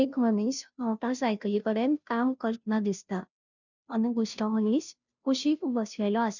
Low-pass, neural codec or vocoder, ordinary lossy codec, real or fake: 7.2 kHz; codec, 16 kHz, 0.5 kbps, FunCodec, trained on Chinese and English, 25 frames a second; none; fake